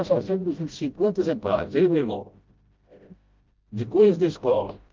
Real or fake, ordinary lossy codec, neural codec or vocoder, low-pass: fake; Opus, 24 kbps; codec, 16 kHz, 0.5 kbps, FreqCodec, smaller model; 7.2 kHz